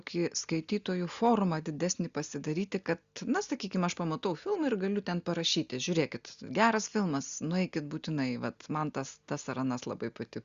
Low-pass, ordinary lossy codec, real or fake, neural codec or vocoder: 7.2 kHz; Opus, 64 kbps; real; none